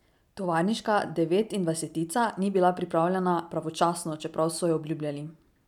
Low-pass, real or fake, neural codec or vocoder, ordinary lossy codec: 19.8 kHz; real; none; none